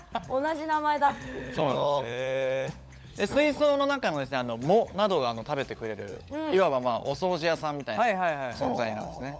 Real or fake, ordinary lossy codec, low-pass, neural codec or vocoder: fake; none; none; codec, 16 kHz, 16 kbps, FunCodec, trained on LibriTTS, 50 frames a second